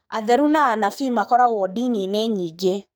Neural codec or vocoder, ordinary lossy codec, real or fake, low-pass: codec, 44.1 kHz, 2.6 kbps, SNAC; none; fake; none